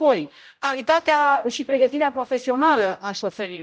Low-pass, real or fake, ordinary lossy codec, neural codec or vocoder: none; fake; none; codec, 16 kHz, 0.5 kbps, X-Codec, HuBERT features, trained on general audio